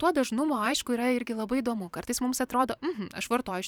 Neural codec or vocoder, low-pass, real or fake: vocoder, 44.1 kHz, 128 mel bands every 512 samples, BigVGAN v2; 19.8 kHz; fake